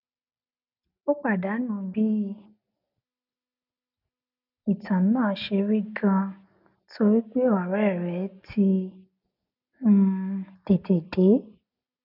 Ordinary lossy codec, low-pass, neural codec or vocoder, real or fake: none; 5.4 kHz; none; real